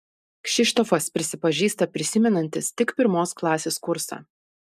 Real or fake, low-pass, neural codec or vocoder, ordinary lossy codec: real; 14.4 kHz; none; MP3, 96 kbps